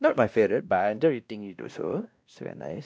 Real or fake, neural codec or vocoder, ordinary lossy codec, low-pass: fake; codec, 16 kHz, 1 kbps, X-Codec, WavLM features, trained on Multilingual LibriSpeech; none; none